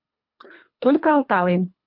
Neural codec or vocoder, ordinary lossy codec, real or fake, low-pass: codec, 24 kHz, 3 kbps, HILCodec; MP3, 48 kbps; fake; 5.4 kHz